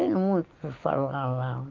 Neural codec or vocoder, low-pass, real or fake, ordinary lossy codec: none; 7.2 kHz; real; Opus, 32 kbps